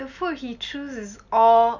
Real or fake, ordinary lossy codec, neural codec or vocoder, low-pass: real; none; none; 7.2 kHz